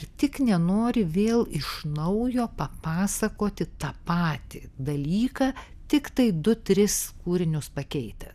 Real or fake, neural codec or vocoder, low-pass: real; none; 14.4 kHz